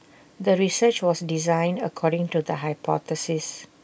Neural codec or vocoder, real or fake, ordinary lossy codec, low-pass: none; real; none; none